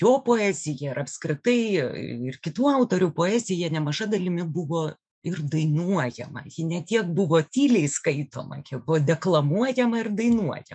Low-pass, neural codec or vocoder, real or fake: 9.9 kHz; autoencoder, 48 kHz, 128 numbers a frame, DAC-VAE, trained on Japanese speech; fake